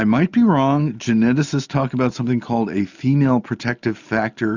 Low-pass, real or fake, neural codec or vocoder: 7.2 kHz; real; none